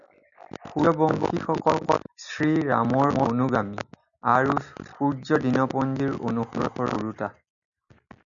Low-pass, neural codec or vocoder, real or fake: 7.2 kHz; none; real